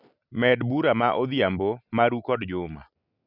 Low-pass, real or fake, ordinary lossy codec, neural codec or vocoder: 5.4 kHz; real; none; none